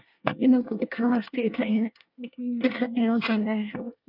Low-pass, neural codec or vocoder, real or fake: 5.4 kHz; codec, 24 kHz, 1 kbps, SNAC; fake